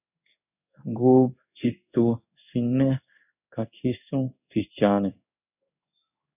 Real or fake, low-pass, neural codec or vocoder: fake; 3.6 kHz; codec, 16 kHz in and 24 kHz out, 1 kbps, XY-Tokenizer